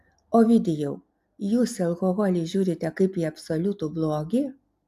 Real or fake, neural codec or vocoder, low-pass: real; none; 14.4 kHz